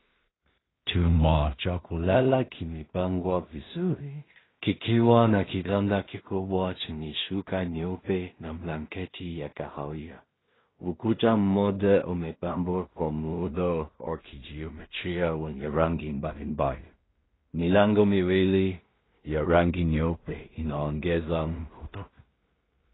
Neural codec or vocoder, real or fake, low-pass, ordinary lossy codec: codec, 16 kHz in and 24 kHz out, 0.4 kbps, LongCat-Audio-Codec, two codebook decoder; fake; 7.2 kHz; AAC, 16 kbps